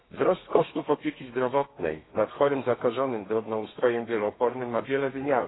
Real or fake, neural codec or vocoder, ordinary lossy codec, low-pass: fake; codec, 32 kHz, 1.9 kbps, SNAC; AAC, 16 kbps; 7.2 kHz